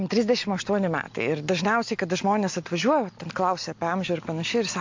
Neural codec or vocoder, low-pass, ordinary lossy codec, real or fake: none; 7.2 kHz; MP3, 48 kbps; real